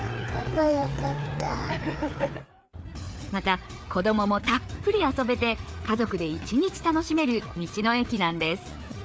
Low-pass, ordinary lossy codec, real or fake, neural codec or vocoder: none; none; fake; codec, 16 kHz, 4 kbps, FreqCodec, larger model